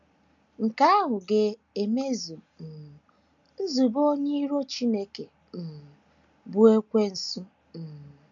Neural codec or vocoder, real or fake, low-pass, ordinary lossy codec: none; real; 7.2 kHz; none